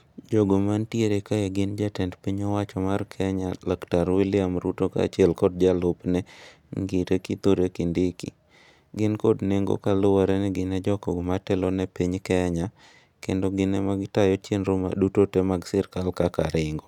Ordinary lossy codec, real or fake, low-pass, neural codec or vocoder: none; real; 19.8 kHz; none